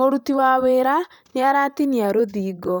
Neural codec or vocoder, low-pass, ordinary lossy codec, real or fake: vocoder, 44.1 kHz, 128 mel bands, Pupu-Vocoder; none; none; fake